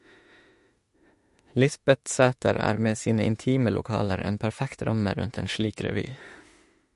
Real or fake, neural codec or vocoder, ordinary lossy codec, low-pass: fake; autoencoder, 48 kHz, 32 numbers a frame, DAC-VAE, trained on Japanese speech; MP3, 48 kbps; 14.4 kHz